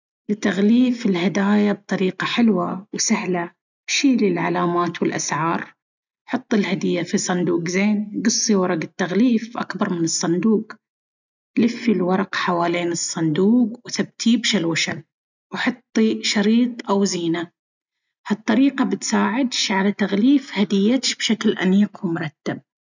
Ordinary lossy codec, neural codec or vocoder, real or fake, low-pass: none; none; real; 7.2 kHz